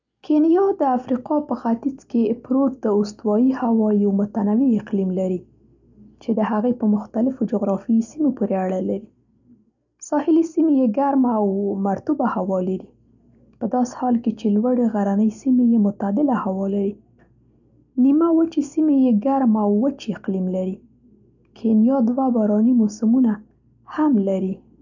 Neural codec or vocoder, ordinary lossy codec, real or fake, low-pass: none; none; real; 7.2 kHz